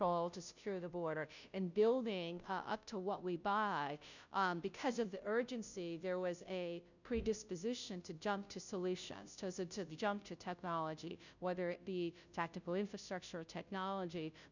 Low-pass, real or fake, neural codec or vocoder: 7.2 kHz; fake; codec, 16 kHz, 0.5 kbps, FunCodec, trained on Chinese and English, 25 frames a second